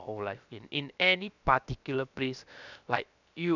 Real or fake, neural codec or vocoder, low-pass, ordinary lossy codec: fake; codec, 16 kHz, about 1 kbps, DyCAST, with the encoder's durations; 7.2 kHz; none